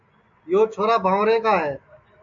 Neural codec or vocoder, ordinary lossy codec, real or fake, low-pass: none; AAC, 64 kbps; real; 7.2 kHz